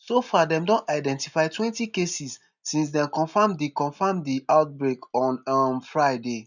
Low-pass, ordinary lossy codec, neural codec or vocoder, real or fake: 7.2 kHz; none; none; real